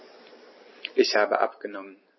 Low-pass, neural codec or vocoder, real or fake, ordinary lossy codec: 7.2 kHz; none; real; MP3, 24 kbps